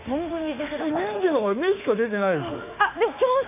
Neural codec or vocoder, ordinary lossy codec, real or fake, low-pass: autoencoder, 48 kHz, 32 numbers a frame, DAC-VAE, trained on Japanese speech; none; fake; 3.6 kHz